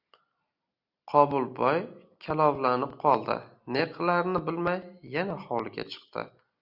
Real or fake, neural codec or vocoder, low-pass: real; none; 5.4 kHz